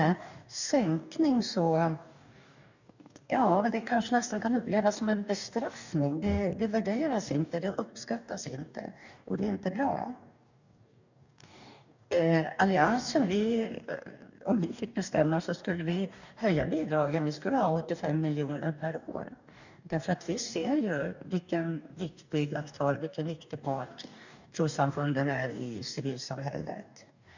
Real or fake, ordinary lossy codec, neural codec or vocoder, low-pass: fake; none; codec, 44.1 kHz, 2.6 kbps, DAC; 7.2 kHz